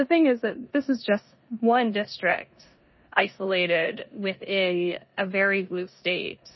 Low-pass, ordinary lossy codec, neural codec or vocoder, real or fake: 7.2 kHz; MP3, 24 kbps; codec, 16 kHz in and 24 kHz out, 0.9 kbps, LongCat-Audio-Codec, four codebook decoder; fake